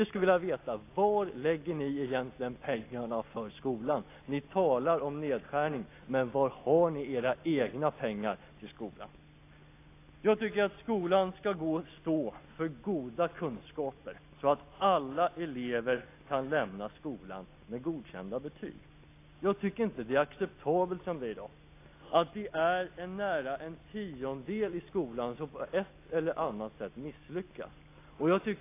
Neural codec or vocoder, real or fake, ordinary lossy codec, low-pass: none; real; AAC, 24 kbps; 3.6 kHz